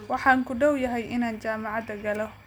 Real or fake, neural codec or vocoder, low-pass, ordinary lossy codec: real; none; none; none